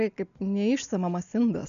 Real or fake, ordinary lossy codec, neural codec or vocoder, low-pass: real; MP3, 96 kbps; none; 7.2 kHz